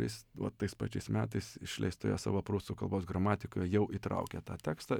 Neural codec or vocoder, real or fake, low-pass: none; real; 19.8 kHz